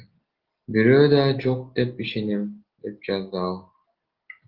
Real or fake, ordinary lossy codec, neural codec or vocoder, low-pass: real; Opus, 16 kbps; none; 5.4 kHz